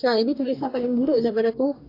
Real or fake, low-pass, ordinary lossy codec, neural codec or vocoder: fake; 5.4 kHz; AAC, 32 kbps; codec, 44.1 kHz, 2.6 kbps, DAC